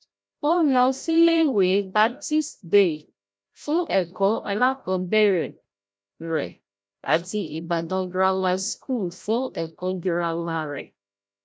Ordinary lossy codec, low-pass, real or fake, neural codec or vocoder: none; none; fake; codec, 16 kHz, 0.5 kbps, FreqCodec, larger model